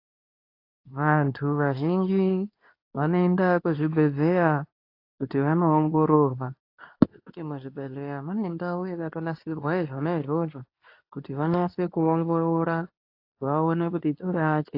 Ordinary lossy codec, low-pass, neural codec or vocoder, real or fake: AAC, 32 kbps; 5.4 kHz; codec, 24 kHz, 0.9 kbps, WavTokenizer, medium speech release version 2; fake